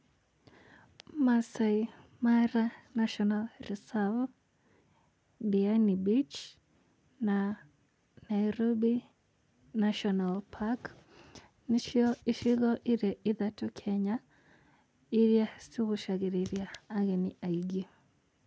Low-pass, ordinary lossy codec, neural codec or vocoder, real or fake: none; none; none; real